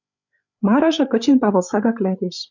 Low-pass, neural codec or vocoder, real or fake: 7.2 kHz; codec, 16 kHz, 8 kbps, FreqCodec, larger model; fake